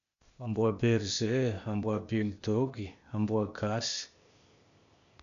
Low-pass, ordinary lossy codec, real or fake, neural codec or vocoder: 7.2 kHz; none; fake; codec, 16 kHz, 0.8 kbps, ZipCodec